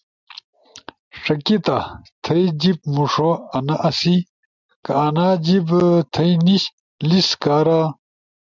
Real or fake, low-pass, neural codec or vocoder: real; 7.2 kHz; none